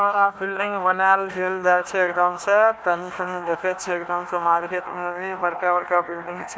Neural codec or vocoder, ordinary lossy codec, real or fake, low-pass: codec, 16 kHz, 1 kbps, FunCodec, trained on Chinese and English, 50 frames a second; none; fake; none